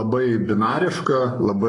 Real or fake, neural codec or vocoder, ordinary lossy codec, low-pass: fake; codec, 44.1 kHz, 7.8 kbps, Pupu-Codec; AAC, 32 kbps; 10.8 kHz